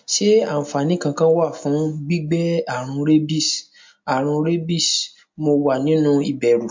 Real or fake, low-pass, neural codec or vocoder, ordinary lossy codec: real; 7.2 kHz; none; MP3, 48 kbps